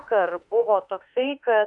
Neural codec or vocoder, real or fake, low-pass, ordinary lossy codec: autoencoder, 48 kHz, 32 numbers a frame, DAC-VAE, trained on Japanese speech; fake; 10.8 kHz; AAC, 64 kbps